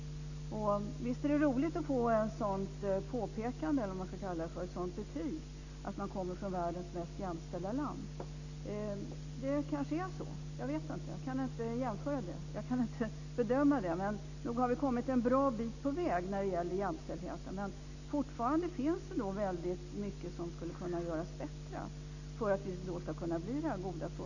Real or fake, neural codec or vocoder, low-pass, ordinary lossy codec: real; none; 7.2 kHz; none